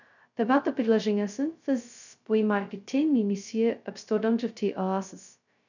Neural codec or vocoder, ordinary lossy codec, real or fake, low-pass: codec, 16 kHz, 0.2 kbps, FocalCodec; none; fake; 7.2 kHz